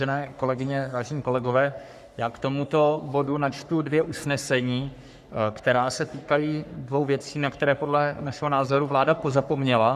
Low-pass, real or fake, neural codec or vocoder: 14.4 kHz; fake; codec, 44.1 kHz, 3.4 kbps, Pupu-Codec